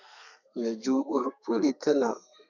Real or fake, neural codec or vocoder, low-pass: fake; codec, 32 kHz, 1.9 kbps, SNAC; 7.2 kHz